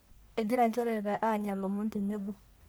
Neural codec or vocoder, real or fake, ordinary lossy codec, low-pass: codec, 44.1 kHz, 1.7 kbps, Pupu-Codec; fake; none; none